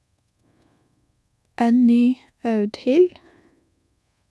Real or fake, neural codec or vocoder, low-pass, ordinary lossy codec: fake; codec, 24 kHz, 1.2 kbps, DualCodec; none; none